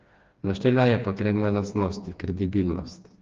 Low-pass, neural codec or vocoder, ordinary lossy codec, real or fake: 7.2 kHz; codec, 16 kHz, 2 kbps, FreqCodec, smaller model; Opus, 32 kbps; fake